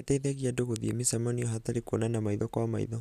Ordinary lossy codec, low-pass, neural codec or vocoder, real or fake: none; 14.4 kHz; none; real